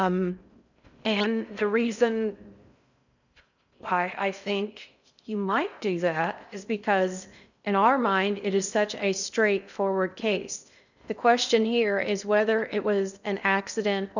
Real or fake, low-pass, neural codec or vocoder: fake; 7.2 kHz; codec, 16 kHz in and 24 kHz out, 0.6 kbps, FocalCodec, streaming, 4096 codes